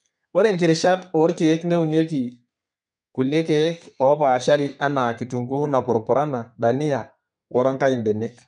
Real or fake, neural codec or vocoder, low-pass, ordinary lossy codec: fake; codec, 32 kHz, 1.9 kbps, SNAC; 10.8 kHz; none